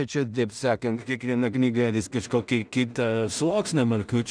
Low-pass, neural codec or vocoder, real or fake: 9.9 kHz; codec, 16 kHz in and 24 kHz out, 0.4 kbps, LongCat-Audio-Codec, two codebook decoder; fake